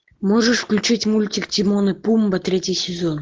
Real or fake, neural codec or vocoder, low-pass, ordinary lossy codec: real; none; 7.2 kHz; Opus, 16 kbps